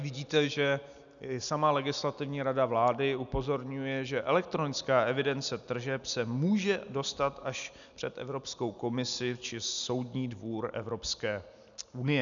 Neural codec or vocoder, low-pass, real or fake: none; 7.2 kHz; real